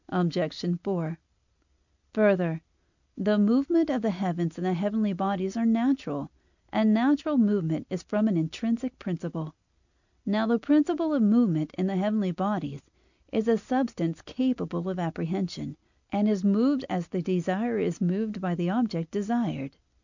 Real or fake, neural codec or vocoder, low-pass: real; none; 7.2 kHz